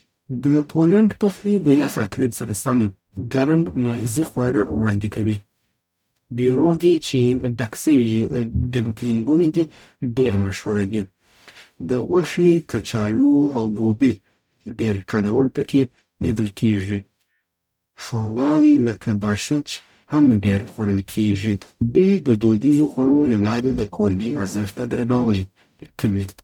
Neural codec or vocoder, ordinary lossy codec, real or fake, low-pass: codec, 44.1 kHz, 0.9 kbps, DAC; none; fake; 19.8 kHz